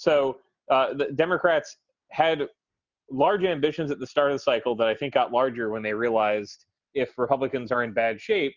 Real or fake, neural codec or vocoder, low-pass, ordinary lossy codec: real; none; 7.2 kHz; Opus, 64 kbps